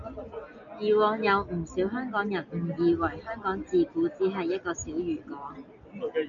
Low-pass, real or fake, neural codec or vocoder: 7.2 kHz; real; none